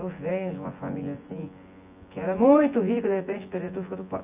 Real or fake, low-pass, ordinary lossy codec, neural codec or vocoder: fake; 3.6 kHz; Opus, 64 kbps; vocoder, 24 kHz, 100 mel bands, Vocos